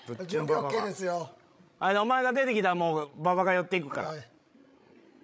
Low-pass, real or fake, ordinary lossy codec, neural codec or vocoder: none; fake; none; codec, 16 kHz, 16 kbps, FreqCodec, larger model